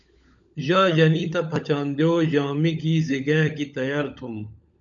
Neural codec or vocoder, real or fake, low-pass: codec, 16 kHz, 16 kbps, FunCodec, trained on LibriTTS, 50 frames a second; fake; 7.2 kHz